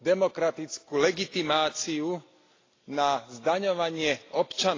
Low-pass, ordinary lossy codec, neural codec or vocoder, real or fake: 7.2 kHz; AAC, 32 kbps; none; real